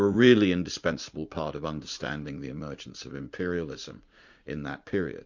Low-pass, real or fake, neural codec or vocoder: 7.2 kHz; fake; vocoder, 44.1 kHz, 80 mel bands, Vocos